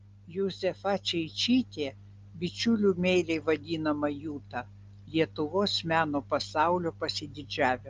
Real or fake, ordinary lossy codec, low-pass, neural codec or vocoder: real; Opus, 32 kbps; 7.2 kHz; none